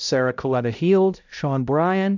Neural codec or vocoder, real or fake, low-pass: codec, 16 kHz, 0.5 kbps, X-Codec, HuBERT features, trained on balanced general audio; fake; 7.2 kHz